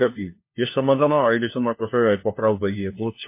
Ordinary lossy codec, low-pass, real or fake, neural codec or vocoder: MP3, 24 kbps; 3.6 kHz; fake; codec, 16 kHz, 1 kbps, FunCodec, trained on LibriTTS, 50 frames a second